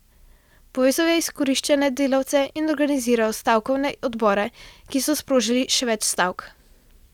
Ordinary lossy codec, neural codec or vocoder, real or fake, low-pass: none; none; real; 19.8 kHz